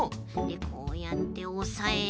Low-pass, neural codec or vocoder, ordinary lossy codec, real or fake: none; none; none; real